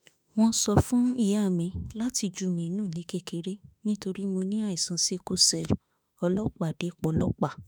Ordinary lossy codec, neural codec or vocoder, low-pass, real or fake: none; autoencoder, 48 kHz, 32 numbers a frame, DAC-VAE, trained on Japanese speech; none; fake